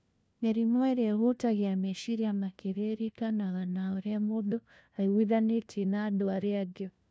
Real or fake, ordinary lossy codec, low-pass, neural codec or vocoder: fake; none; none; codec, 16 kHz, 1 kbps, FunCodec, trained on LibriTTS, 50 frames a second